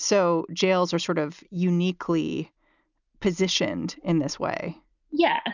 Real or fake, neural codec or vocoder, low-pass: real; none; 7.2 kHz